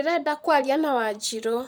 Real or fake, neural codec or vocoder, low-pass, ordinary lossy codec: fake; vocoder, 44.1 kHz, 128 mel bands, Pupu-Vocoder; none; none